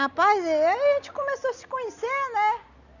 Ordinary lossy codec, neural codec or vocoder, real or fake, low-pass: none; none; real; 7.2 kHz